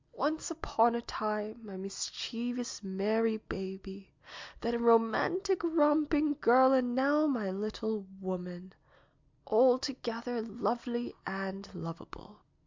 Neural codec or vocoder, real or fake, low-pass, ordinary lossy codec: none; real; 7.2 kHz; MP3, 64 kbps